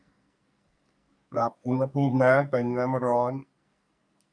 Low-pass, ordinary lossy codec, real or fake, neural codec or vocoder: 9.9 kHz; none; fake; codec, 44.1 kHz, 2.6 kbps, SNAC